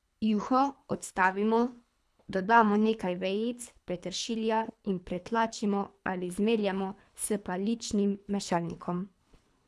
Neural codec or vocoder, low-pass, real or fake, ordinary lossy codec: codec, 24 kHz, 3 kbps, HILCodec; none; fake; none